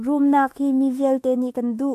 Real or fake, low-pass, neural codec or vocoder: fake; 14.4 kHz; autoencoder, 48 kHz, 32 numbers a frame, DAC-VAE, trained on Japanese speech